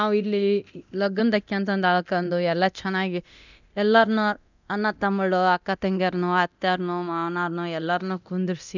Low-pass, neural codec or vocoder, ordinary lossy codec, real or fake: 7.2 kHz; codec, 24 kHz, 0.9 kbps, DualCodec; none; fake